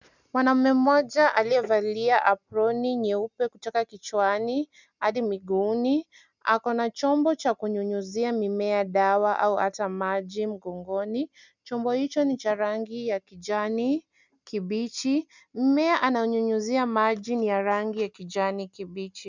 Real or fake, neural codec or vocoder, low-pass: real; none; 7.2 kHz